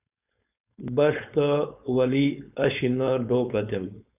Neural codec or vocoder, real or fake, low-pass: codec, 16 kHz, 4.8 kbps, FACodec; fake; 3.6 kHz